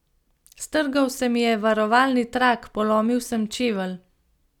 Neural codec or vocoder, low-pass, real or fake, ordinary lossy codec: none; 19.8 kHz; real; none